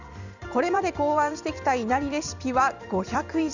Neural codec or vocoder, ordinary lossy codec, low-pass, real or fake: vocoder, 44.1 kHz, 128 mel bands every 256 samples, BigVGAN v2; none; 7.2 kHz; fake